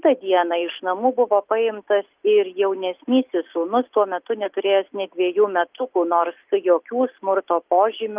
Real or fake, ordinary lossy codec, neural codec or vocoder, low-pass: real; Opus, 32 kbps; none; 3.6 kHz